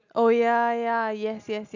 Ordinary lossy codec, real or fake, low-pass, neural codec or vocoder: none; real; 7.2 kHz; none